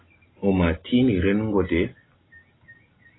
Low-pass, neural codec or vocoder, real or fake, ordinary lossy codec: 7.2 kHz; none; real; AAC, 16 kbps